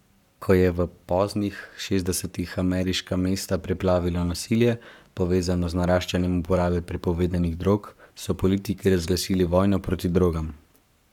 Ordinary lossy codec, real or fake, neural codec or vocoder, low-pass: none; fake; codec, 44.1 kHz, 7.8 kbps, Pupu-Codec; 19.8 kHz